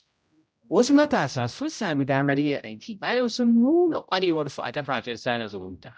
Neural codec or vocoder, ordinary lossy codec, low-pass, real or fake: codec, 16 kHz, 0.5 kbps, X-Codec, HuBERT features, trained on general audio; none; none; fake